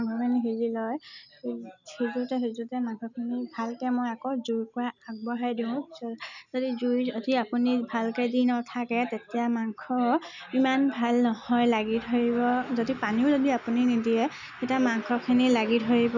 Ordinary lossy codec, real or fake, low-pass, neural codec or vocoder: none; real; 7.2 kHz; none